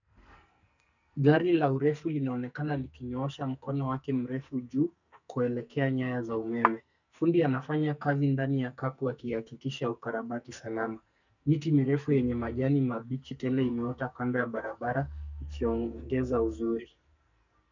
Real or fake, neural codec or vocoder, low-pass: fake; codec, 44.1 kHz, 2.6 kbps, SNAC; 7.2 kHz